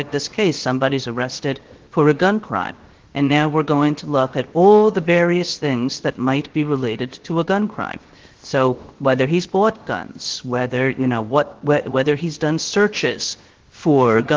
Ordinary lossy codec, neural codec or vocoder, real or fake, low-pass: Opus, 16 kbps; codec, 16 kHz, 0.7 kbps, FocalCodec; fake; 7.2 kHz